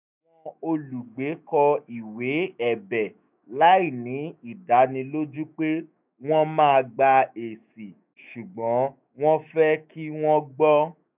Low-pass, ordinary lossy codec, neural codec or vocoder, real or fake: 3.6 kHz; none; autoencoder, 48 kHz, 128 numbers a frame, DAC-VAE, trained on Japanese speech; fake